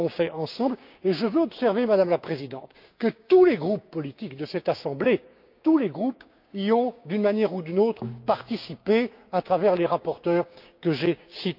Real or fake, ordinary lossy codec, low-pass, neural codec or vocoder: fake; none; 5.4 kHz; codec, 16 kHz, 6 kbps, DAC